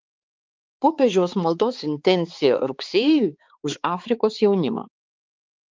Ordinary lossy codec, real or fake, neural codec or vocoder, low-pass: Opus, 24 kbps; fake; codec, 16 kHz, 4 kbps, X-Codec, HuBERT features, trained on balanced general audio; 7.2 kHz